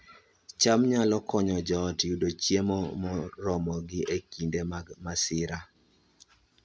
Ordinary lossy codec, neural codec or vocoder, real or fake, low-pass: none; none; real; none